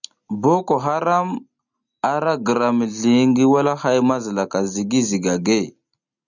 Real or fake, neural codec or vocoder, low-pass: real; none; 7.2 kHz